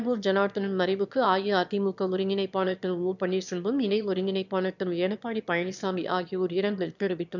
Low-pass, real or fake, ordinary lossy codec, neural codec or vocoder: 7.2 kHz; fake; none; autoencoder, 22.05 kHz, a latent of 192 numbers a frame, VITS, trained on one speaker